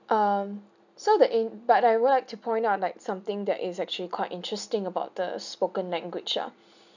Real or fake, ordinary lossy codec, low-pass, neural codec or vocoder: real; none; 7.2 kHz; none